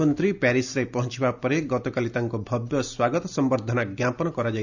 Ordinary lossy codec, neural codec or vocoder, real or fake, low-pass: none; none; real; 7.2 kHz